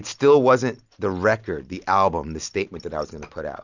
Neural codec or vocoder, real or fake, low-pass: none; real; 7.2 kHz